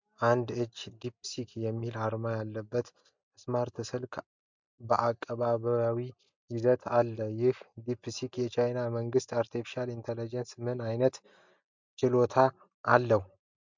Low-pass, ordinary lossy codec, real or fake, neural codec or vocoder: 7.2 kHz; MP3, 64 kbps; real; none